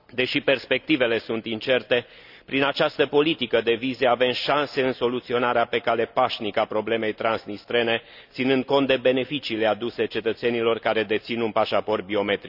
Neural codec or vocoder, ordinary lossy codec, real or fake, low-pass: none; none; real; 5.4 kHz